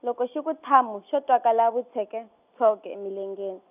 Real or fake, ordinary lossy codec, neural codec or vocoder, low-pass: real; none; none; 3.6 kHz